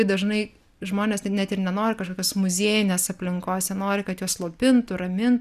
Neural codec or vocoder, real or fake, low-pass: none; real; 14.4 kHz